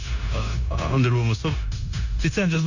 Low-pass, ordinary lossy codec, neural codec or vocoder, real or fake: 7.2 kHz; none; codec, 16 kHz, 0.9 kbps, LongCat-Audio-Codec; fake